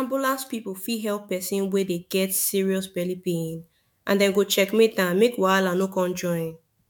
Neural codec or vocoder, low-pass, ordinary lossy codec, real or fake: autoencoder, 48 kHz, 128 numbers a frame, DAC-VAE, trained on Japanese speech; 19.8 kHz; MP3, 96 kbps; fake